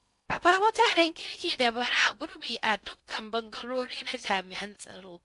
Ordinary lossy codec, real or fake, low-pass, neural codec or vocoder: AAC, 64 kbps; fake; 10.8 kHz; codec, 16 kHz in and 24 kHz out, 0.6 kbps, FocalCodec, streaming, 2048 codes